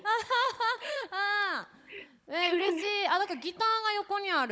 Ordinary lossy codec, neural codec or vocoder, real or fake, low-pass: none; codec, 16 kHz, 16 kbps, FunCodec, trained on Chinese and English, 50 frames a second; fake; none